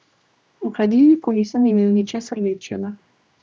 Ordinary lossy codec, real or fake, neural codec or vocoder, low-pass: none; fake; codec, 16 kHz, 1 kbps, X-Codec, HuBERT features, trained on general audio; none